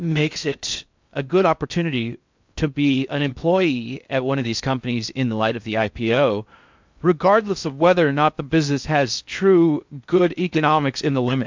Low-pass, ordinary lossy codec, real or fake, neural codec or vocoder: 7.2 kHz; MP3, 64 kbps; fake; codec, 16 kHz in and 24 kHz out, 0.6 kbps, FocalCodec, streaming, 2048 codes